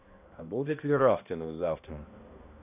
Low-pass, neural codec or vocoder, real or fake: 3.6 kHz; codec, 16 kHz, 1 kbps, X-Codec, HuBERT features, trained on balanced general audio; fake